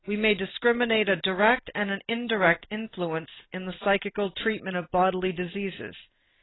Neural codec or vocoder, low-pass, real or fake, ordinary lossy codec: none; 7.2 kHz; real; AAC, 16 kbps